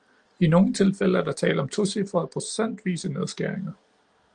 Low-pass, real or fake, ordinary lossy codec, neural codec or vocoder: 9.9 kHz; real; Opus, 24 kbps; none